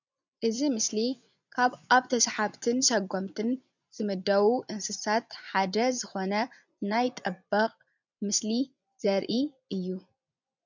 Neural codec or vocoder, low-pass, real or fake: vocoder, 24 kHz, 100 mel bands, Vocos; 7.2 kHz; fake